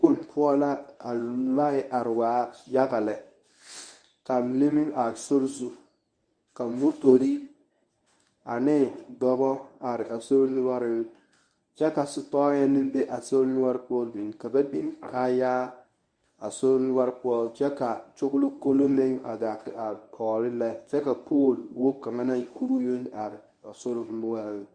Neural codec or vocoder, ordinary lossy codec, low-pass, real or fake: codec, 24 kHz, 0.9 kbps, WavTokenizer, medium speech release version 1; Opus, 64 kbps; 9.9 kHz; fake